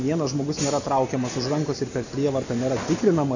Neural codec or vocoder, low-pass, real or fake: none; 7.2 kHz; real